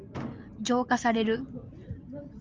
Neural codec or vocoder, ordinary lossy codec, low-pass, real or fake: none; Opus, 24 kbps; 7.2 kHz; real